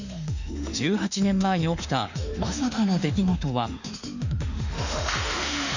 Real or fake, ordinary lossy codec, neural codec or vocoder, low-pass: fake; none; autoencoder, 48 kHz, 32 numbers a frame, DAC-VAE, trained on Japanese speech; 7.2 kHz